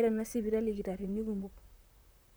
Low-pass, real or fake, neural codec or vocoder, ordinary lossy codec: none; fake; vocoder, 44.1 kHz, 128 mel bands, Pupu-Vocoder; none